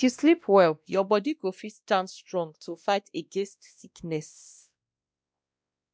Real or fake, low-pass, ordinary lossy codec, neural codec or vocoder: fake; none; none; codec, 16 kHz, 1 kbps, X-Codec, WavLM features, trained on Multilingual LibriSpeech